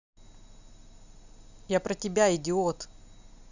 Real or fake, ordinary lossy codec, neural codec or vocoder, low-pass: real; none; none; 7.2 kHz